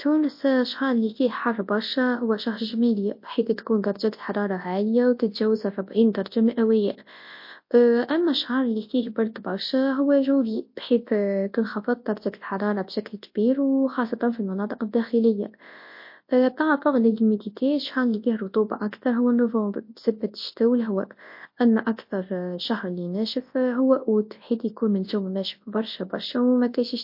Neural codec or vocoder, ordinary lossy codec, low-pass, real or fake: codec, 24 kHz, 0.9 kbps, WavTokenizer, large speech release; MP3, 32 kbps; 5.4 kHz; fake